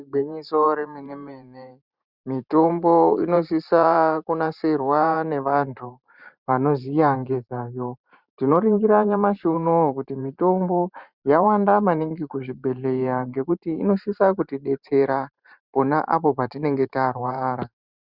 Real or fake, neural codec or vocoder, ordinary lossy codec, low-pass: fake; vocoder, 44.1 kHz, 128 mel bands every 512 samples, BigVGAN v2; Opus, 64 kbps; 5.4 kHz